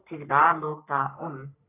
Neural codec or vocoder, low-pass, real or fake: codec, 44.1 kHz, 3.4 kbps, Pupu-Codec; 3.6 kHz; fake